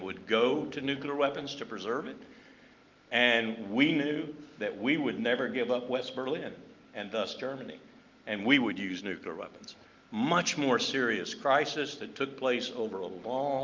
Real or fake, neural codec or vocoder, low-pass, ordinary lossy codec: real; none; 7.2 kHz; Opus, 24 kbps